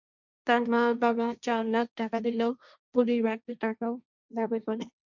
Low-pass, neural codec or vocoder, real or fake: 7.2 kHz; codec, 16 kHz, 1.1 kbps, Voila-Tokenizer; fake